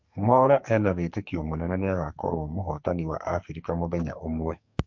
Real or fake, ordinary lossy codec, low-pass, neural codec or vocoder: fake; MP3, 48 kbps; 7.2 kHz; codec, 44.1 kHz, 2.6 kbps, SNAC